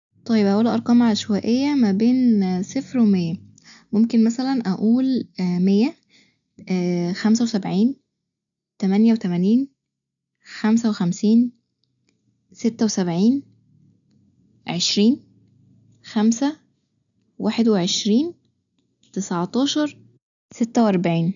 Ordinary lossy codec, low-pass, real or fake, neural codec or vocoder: none; 7.2 kHz; real; none